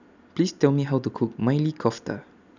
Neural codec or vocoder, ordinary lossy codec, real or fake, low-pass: none; none; real; 7.2 kHz